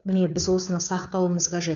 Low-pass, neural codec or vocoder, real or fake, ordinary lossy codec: 7.2 kHz; codec, 16 kHz, 2 kbps, FunCodec, trained on Chinese and English, 25 frames a second; fake; none